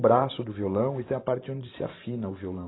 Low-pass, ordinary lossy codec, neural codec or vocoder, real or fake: 7.2 kHz; AAC, 16 kbps; vocoder, 44.1 kHz, 128 mel bands every 512 samples, BigVGAN v2; fake